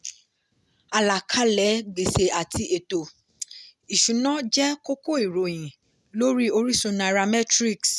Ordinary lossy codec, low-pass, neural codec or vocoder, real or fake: none; none; none; real